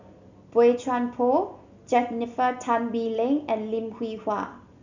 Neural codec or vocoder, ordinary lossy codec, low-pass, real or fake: none; none; 7.2 kHz; real